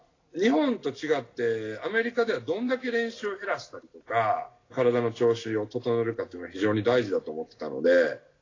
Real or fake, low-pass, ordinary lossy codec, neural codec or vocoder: real; 7.2 kHz; AAC, 32 kbps; none